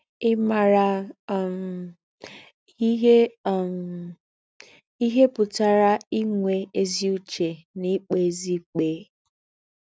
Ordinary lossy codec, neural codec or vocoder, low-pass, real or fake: none; none; none; real